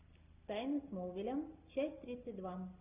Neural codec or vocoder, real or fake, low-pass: none; real; 3.6 kHz